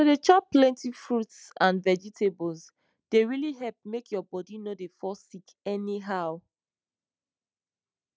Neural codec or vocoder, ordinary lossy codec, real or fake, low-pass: none; none; real; none